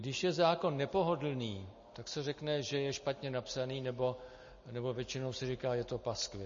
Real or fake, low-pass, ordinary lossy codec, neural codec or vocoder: real; 7.2 kHz; MP3, 32 kbps; none